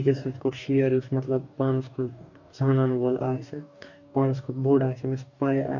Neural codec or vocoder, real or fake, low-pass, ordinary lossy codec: codec, 44.1 kHz, 2.6 kbps, DAC; fake; 7.2 kHz; none